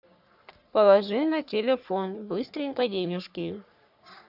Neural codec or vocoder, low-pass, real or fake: codec, 44.1 kHz, 3.4 kbps, Pupu-Codec; 5.4 kHz; fake